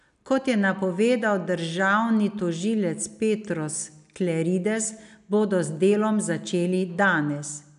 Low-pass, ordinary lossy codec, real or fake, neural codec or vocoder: 10.8 kHz; none; real; none